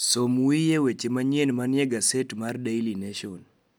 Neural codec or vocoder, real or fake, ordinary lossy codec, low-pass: none; real; none; none